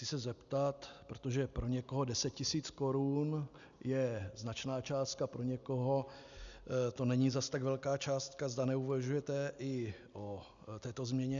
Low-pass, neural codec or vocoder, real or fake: 7.2 kHz; none; real